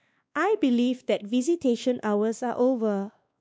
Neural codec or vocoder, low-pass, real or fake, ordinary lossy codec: codec, 16 kHz, 2 kbps, X-Codec, WavLM features, trained on Multilingual LibriSpeech; none; fake; none